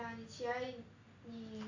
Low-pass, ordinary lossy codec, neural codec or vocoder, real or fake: 7.2 kHz; AAC, 48 kbps; none; real